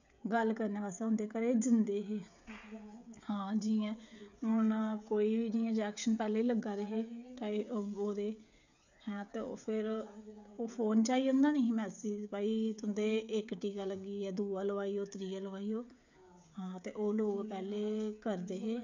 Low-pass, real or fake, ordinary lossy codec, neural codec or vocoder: 7.2 kHz; fake; none; codec, 16 kHz, 16 kbps, FreqCodec, smaller model